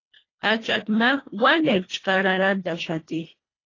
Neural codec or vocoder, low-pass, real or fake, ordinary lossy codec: codec, 24 kHz, 1.5 kbps, HILCodec; 7.2 kHz; fake; AAC, 32 kbps